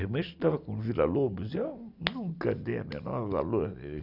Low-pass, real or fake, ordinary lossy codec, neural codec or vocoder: 5.4 kHz; real; none; none